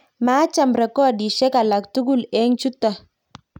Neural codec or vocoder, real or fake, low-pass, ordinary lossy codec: none; real; 19.8 kHz; none